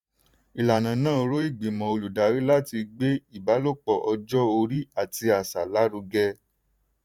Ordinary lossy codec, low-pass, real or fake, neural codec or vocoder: none; 19.8 kHz; fake; vocoder, 44.1 kHz, 128 mel bands every 512 samples, BigVGAN v2